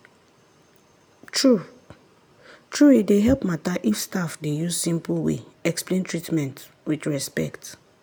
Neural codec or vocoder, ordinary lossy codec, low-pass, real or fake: none; none; none; real